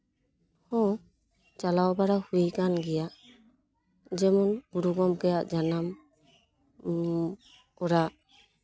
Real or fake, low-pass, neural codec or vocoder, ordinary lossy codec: real; none; none; none